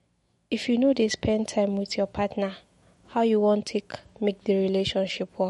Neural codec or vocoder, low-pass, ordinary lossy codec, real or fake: autoencoder, 48 kHz, 128 numbers a frame, DAC-VAE, trained on Japanese speech; 19.8 kHz; MP3, 48 kbps; fake